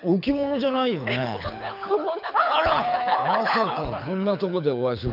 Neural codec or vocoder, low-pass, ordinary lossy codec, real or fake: codec, 24 kHz, 6 kbps, HILCodec; 5.4 kHz; none; fake